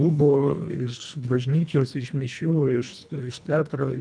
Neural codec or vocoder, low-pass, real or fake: codec, 24 kHz, 1.5 kbps, HILCodec; 9.9 kHz; fake